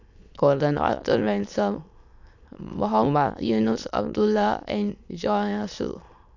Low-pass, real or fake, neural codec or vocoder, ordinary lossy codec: 7.2 kHz; fake; autoencoder, 22.05 kHz, a latent of 192 numbers a frame, VITS, trained on many speakers; none